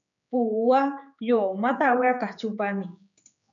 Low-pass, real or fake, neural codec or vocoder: 7.2 kHz; fake; codec, 16 kHz, 4 kbps, X-Codec, HuBERT features, trained on general audio